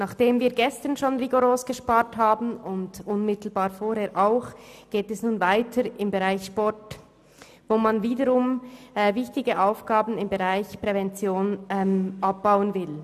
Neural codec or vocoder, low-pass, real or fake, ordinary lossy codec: none; 14.4 kHz; real; none